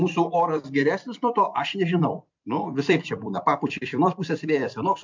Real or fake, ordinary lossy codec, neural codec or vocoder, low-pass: fake; MP3, 64 kbps; vocoder, 44.1 kHz, 128 mel bands every 256 samples, BigVGAN v2; 7.2 kHz